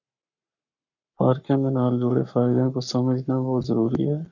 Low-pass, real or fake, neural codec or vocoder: 7.2 kHz; fake; codec, 44.1 kHz, 7.8 kbps, Pupu-Codec